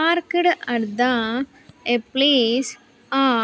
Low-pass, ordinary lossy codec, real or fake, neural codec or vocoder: none; none; real; none